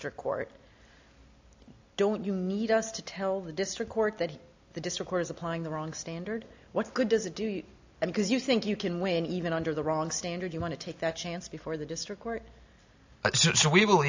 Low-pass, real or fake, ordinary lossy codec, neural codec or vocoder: 7.2 kHz; real; AAC, 48 kbps; none